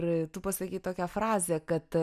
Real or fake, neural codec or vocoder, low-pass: real; none; 14.4 kHz